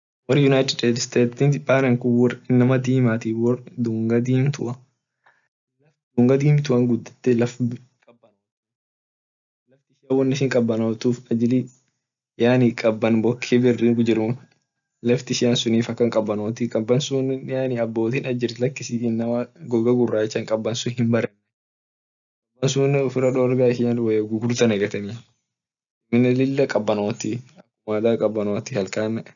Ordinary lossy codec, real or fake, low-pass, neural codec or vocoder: none; real; 7.2 kHz; none